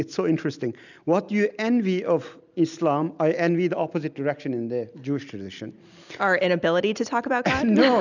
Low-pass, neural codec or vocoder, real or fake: 7.2 kHz; none; real